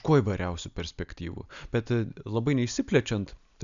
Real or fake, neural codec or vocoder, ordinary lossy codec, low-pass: real; none; MP3, 96 kbps; 7.2 kHz